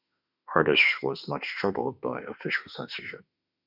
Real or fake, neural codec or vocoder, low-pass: fake; autoencoder, 48 kHz, 32 numbers a frame, DAC-VAE, trained on Japanese speech; 5.4 kHz